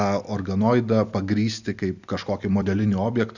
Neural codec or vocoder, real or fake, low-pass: none; real; 7.2 kHz